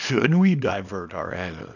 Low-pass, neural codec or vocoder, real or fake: 7.2 kHz; codec, 24 kHz, 0.9 kbps, WavTokenizer, small release; fake